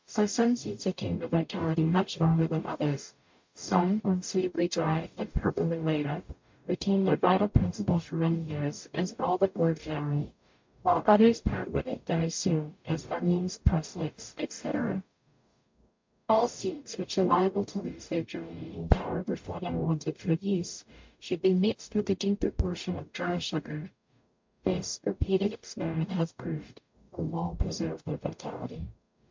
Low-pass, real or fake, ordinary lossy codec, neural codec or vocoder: 7.2 kHz; fake; MP3, 48 kbps; codec, 44.1 kHz, 0.9 kbps, DAC